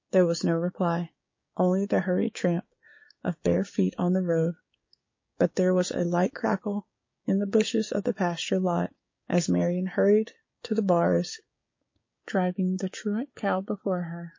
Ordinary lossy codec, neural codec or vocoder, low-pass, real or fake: MP3, 32 kbps; codec, 16 kHz, 6 kbps, DAC; 7.2 kHz; fake